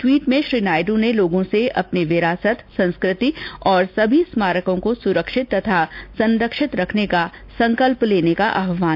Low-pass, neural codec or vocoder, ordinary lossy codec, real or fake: 5.4 kHz; none; none; real